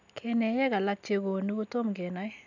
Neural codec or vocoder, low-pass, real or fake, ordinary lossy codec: none; 7.2 kHz; real; none